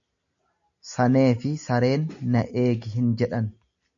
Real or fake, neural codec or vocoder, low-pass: real; none; 7.2 kHz